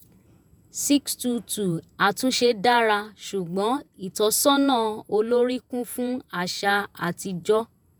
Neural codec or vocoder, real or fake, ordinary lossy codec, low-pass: vocoder, 48 kHz, 128 mel bands, Vocos; fake; none; none